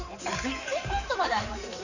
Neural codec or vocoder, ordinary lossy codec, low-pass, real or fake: codec, 16 kHz in and 24 kHz out, 2.2 kbps, FireRedTTS-2 codec; none; 7.2 kHz; fake